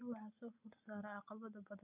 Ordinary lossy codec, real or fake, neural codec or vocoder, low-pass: none; real; none; 3.6 kHz